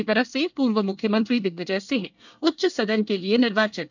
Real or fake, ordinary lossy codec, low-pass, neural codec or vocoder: fake; none; 7.2 kHz; codec, 24 kHz, 1 kbps, SNAC